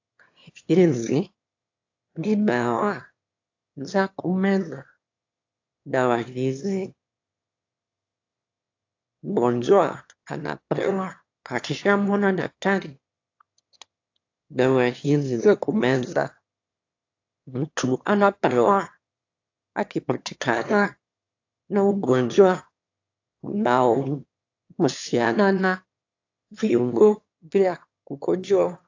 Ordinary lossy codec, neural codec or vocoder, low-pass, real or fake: AAC, 48 kbps; autoencoder, 22.05 kHz, a latent of 192 numbers a frame, VITS, trained on one speaker; 7.2 kHz; fake